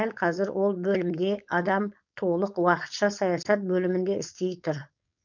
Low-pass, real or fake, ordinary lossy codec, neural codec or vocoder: 7.2 kHz; fake; none; codec, 16 kHz, 4.8 kbps, FACodec